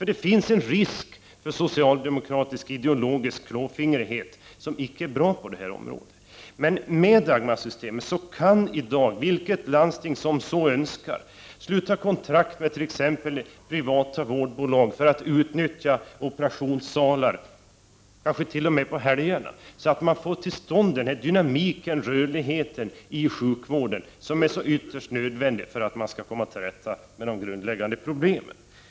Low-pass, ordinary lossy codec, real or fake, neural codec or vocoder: none; none; real; none